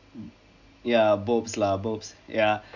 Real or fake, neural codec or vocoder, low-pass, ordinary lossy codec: real; none; 7.2 kHz; none